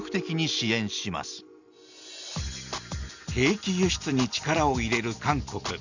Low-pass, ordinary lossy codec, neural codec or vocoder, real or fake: 7.2 kHz; none; none; real